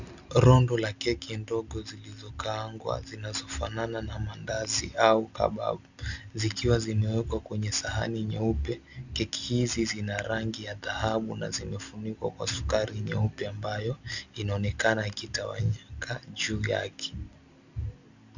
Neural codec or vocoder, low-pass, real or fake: none; 7.2 kHz; real